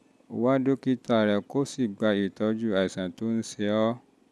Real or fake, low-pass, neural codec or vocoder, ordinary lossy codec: real; none; none; none